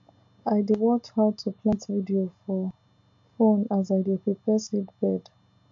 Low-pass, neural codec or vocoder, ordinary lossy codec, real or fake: 7.2 kHz; none; AAC, 48 kbps; real